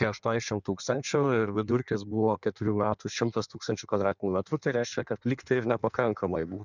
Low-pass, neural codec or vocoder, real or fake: 7.2 kHz; codec, 16 kHz in and 24 kHz out, 1.1 kbps, FireRedTTS-2 codec; fake